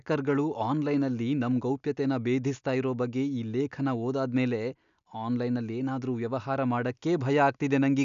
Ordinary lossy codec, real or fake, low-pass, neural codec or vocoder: none; real; 7.2 kHz; none